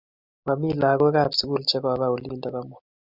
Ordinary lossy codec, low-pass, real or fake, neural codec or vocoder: Opus, 64 kbps; 5.4 kHz; real; none